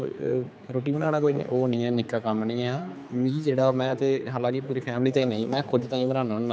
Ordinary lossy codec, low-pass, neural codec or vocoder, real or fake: none; none; codec, 16 kHz, 4 kbps, X-Codec, HuBERT features, trained on general audio; fake